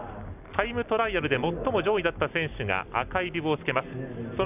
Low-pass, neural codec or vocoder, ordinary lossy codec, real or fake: 3.6 kHz; none; none; real